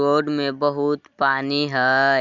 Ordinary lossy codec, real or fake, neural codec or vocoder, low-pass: Opus, 64 kbps; real; none; 7.2 kHz